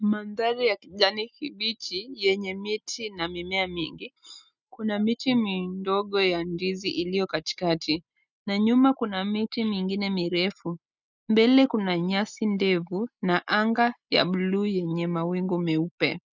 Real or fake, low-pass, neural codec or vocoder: real; 7.2 kHz; none